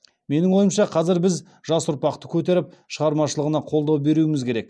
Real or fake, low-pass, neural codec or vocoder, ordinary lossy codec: real; none; none; none